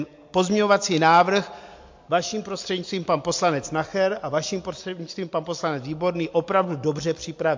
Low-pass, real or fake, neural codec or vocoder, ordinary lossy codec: 7.2 kHz; real; none; MP3, 48 kbps